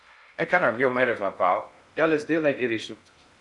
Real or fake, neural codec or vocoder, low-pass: fake; codec, 16 kHz in and 24 kHz out, 0.6 kbps, FocalCodec, streaming, 4096 codes; 10.8 kHz